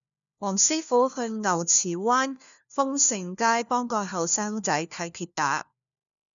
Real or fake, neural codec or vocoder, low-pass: fake; codec, 16 kHz, 1 kbps, FunCodec, trained on LibriTTS, 50 frames a second; 7.2 kHz